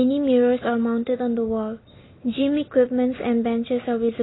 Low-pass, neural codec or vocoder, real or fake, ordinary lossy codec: 7.2 kHz; none; real; AAC, 16 kbps